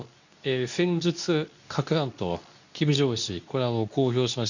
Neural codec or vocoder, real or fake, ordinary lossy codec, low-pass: codec, 24 kHz, 0.9 kbps, WavTokenizer, medium speech release version 2; fake; none; 7.2 kHz